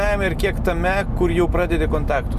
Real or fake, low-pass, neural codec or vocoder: real; 14.4 kHz; none